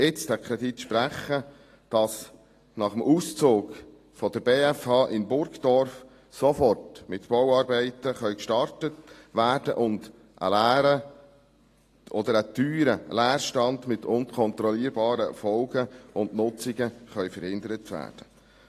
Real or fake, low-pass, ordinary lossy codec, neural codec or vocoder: real; 14.4 kHz; AAC, 48 kbps; none